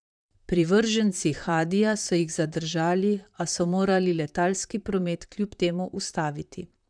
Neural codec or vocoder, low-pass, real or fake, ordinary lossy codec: vocoder, 44.1 kHz, 128 mel bands, Pupu-Vocoder; 9.9 kHz; fake; none